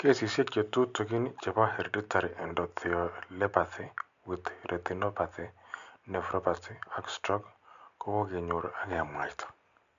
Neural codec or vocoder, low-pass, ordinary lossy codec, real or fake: none; 7.2 kHz; MP3, 64 kbps; real